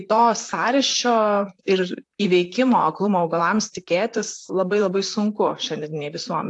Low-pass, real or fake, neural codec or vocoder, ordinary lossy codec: 10.8 kHz; fake; vocoder, 44.1 kHz, 128 mel bands, Pupu-Vocoder; AAC, 64 kbps